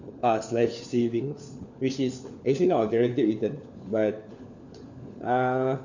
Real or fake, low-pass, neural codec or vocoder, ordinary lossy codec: fake; 7.2 kHz; codec, 16 kHz, 4 kbps, FunCodec, trained on LibriTTS, 50 frames a second; none